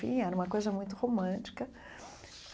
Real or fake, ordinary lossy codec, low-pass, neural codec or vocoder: real; none; none; none